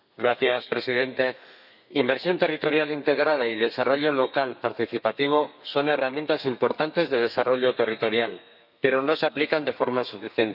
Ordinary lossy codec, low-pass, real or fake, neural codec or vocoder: none; 5.4 kHz; fake; codec, 32 kHz, 1.9 kbps, SNAC